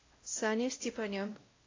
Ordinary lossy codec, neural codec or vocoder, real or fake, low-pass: AAC, 32 kbps; codec, 16 kHz, 0.5 kbps, X-Codec, WavLM features, trained on Multilingual LibriSpeech; fake; 7.2 kHz